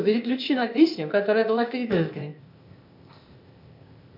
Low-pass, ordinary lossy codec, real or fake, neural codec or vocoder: 5.4 kHz; MP3, 48 kbps; fake; codec, 16 kHz, 0.8 kbps, ZipCodec